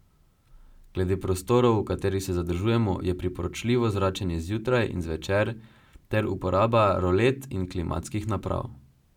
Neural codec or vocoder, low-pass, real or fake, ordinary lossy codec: none; 19.8 kHz; real; none